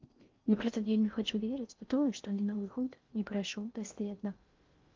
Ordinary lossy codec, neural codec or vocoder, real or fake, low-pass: Opus, 24 kbps; codec, 16 kHz in and 24 kHz out, 0.6 kbps, FocalCodec, streaming, 4096 codes; fake; 7.2 kHz